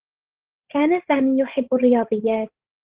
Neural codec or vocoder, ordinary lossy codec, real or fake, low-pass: codec, 16 kHz, 16 kbps, FreqCodec, larger model; Opus, 16 kbps; fake; 3.6 kHz